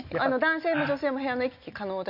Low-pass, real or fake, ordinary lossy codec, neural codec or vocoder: 5.4 kHz; real; MP3, 48 kbps; none